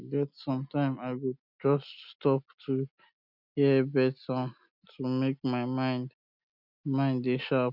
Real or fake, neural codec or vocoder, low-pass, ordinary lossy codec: real; none; 5.4 kHz; none